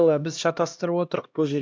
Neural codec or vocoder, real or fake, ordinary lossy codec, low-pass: codec, 16 kHz, 1 kbps, X-Codec, HuBERT features, trained on LibriSpeech; fake; none; none